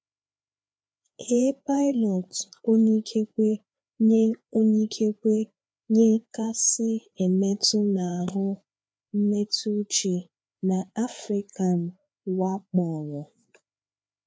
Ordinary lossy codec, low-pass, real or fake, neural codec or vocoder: none; none; fake; codec, 16 kHz, 4 kbps, FreqCodec, larger model